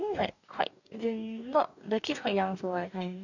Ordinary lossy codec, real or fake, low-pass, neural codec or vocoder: none; fake; 7.2 kHz; codec, 44.1 kHz, 2.6 kbps, DAC